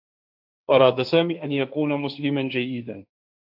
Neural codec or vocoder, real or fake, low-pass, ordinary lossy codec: codec, 16 kHz, 1.1 kbps, Voila-Tokenizer; fake; 5.4 kHz; AAC, 48 kbps